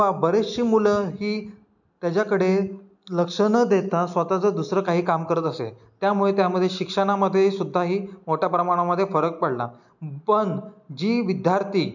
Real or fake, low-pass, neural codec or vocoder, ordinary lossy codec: real; 7.2 kHz; none; none